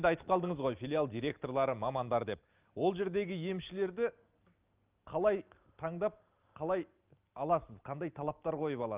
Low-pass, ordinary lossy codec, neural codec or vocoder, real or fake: 3.6 kHz; Opus, 24 kbps; none; real